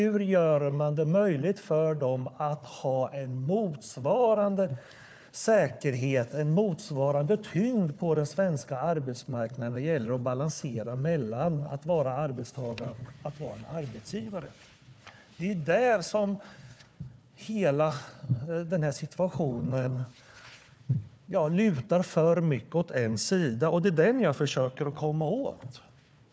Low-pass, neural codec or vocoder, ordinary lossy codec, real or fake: none; codec, 16 kHz, 4 kbps, FunCodec, trained on Chinese and English, 50 frames a second; none; fake